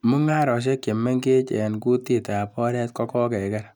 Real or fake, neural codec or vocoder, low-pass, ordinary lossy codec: real; none; 19.8 kHz; none